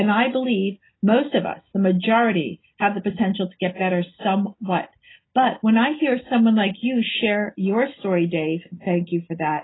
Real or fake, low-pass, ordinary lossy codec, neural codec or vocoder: real; 7.2 kHz; AAC, 16 kbps; none